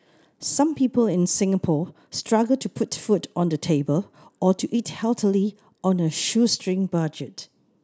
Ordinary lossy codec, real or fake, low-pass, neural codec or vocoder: none; real; none; none